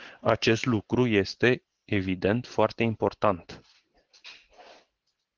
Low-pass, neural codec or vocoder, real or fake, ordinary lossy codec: 7.2 kHz; none; real; Opus, 16 kbps